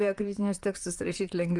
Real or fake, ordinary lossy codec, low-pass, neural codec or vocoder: fake; Opus, 32 kbps; 10.8 kHz; vocoder, 44.1 kHz, 128 mel bands, Pupu-Vocoder